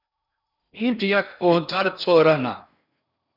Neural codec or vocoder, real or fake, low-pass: codec, 16 kHz in and 24 kHz out, 0.8 kbps, FocalCodec, streaming, 65536 codes; fake; 5.4 kHz